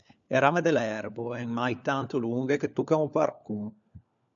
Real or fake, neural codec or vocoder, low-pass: fake; codec, 16 kHz, 4 kbps, FunCodec, trained on LibriTTS, 50 frames a second; 7.2 kHz